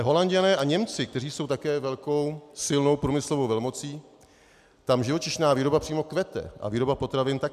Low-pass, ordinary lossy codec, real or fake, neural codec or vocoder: 14.4 kHz; AAC, 96 kbps; real; none